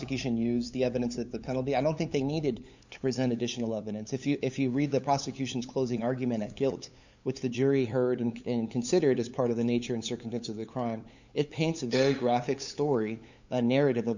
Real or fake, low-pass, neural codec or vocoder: fake; 7.2 kHz; codec, 16 kHz, 8 kbps, FunCodec, trained on LibriTTS, 25 frames a second